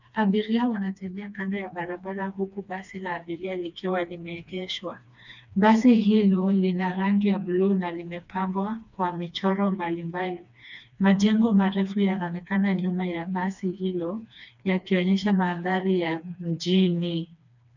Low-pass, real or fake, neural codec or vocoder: 7.2 kHz; fake; codec, 16 kHz, 2 kbps, FreqCodec, smaller model